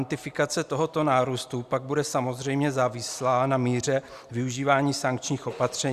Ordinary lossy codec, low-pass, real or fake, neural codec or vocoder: Opus, 64 kbps; 14.4 kHz; real; none